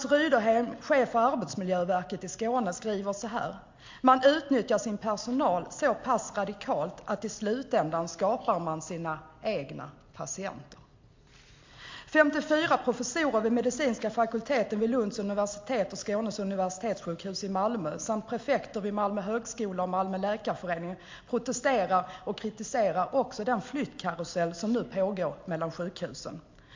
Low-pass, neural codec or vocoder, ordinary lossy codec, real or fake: 7.2 kHz; none; MP3, 48 kbps; real